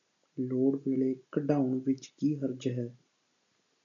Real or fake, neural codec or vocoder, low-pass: real; none; 7.2 kHz